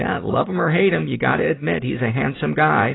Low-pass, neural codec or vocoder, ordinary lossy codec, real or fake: 7.2 kHz; none; AAC, 16 kbps; real